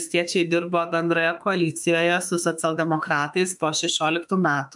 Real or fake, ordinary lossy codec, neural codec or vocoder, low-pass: fake; MP3, 96 kbps; autoencoder, 48 kHz, 32 numbers a frame, DAC-VAE, trained on Japanese speech; 10.8 kHz